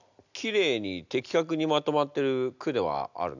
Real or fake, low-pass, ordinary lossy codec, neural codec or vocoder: real; 7.2 kHz; none; none